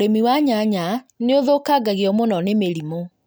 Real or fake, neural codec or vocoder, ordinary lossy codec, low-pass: real; none; none; none